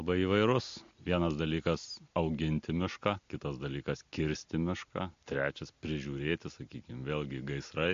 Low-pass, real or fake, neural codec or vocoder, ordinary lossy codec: 7.2 kHz; real; none; MP3, 48 kbps